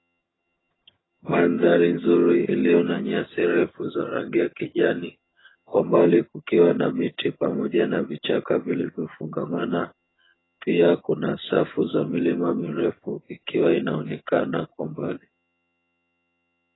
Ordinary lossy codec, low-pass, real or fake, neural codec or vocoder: AAC, 16 kbps; 7.2 kHz; fake; vocoder, 22.05 kHz, 80 mel bands, HiFi-GAN